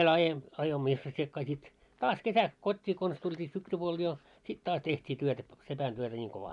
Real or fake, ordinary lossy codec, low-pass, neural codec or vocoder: real; none; 10.8 kHz; none